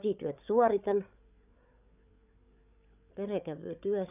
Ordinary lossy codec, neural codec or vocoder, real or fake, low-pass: none; codec, 16 kHz, 8 kbps, FreqCodec, larger model; fake; 3.6 kHz